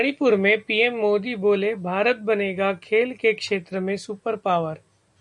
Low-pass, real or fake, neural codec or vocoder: 10.8 kHz; real; none